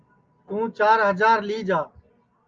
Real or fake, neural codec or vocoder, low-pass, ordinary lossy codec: real; none; 7.2 kHz; Opus, 24 kbps